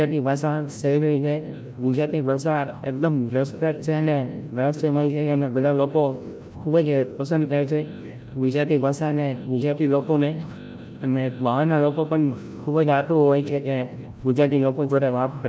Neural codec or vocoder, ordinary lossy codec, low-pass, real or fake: codec, 16 kHz, 0.5 kbps, FreqCodec, larger model; none; none; fake